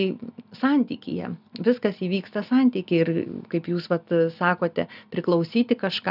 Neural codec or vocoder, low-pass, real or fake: none; 5.4 kHz; real